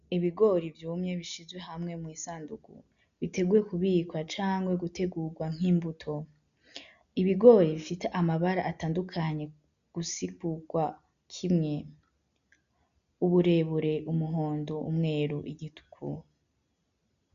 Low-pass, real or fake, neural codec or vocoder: 7.2 kHz; real; none